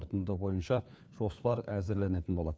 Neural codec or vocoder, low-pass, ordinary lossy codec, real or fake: codec, 16 kHz, 4 kbps, FunCodec, trained on LibriTTS, 50 frames a second; none; none; fake